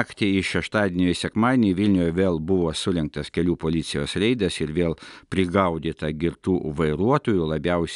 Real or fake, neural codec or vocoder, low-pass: real; none; 10.8 kHz